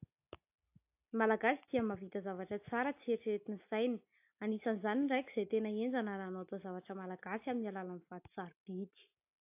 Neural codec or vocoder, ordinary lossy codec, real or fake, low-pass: none; AAC, 32 kbps; real; 3.6 kHz